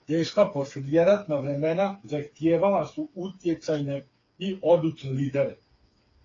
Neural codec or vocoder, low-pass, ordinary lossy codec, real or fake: codec, 16 kHz, 4 kbps, FreqCodec, smaller model; 7.2 kHz; AAC, 32 kbps; fake